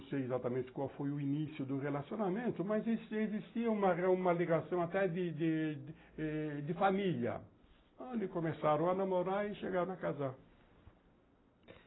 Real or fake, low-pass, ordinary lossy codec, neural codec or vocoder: real; 7.2 kHz; AAC, 16 kbps; none